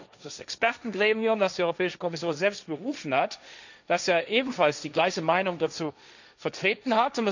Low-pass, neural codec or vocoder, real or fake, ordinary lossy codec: 7.2 kHz; codec, 16 kHz, 1.1 kbps, Voila-Tokenizer; fake; none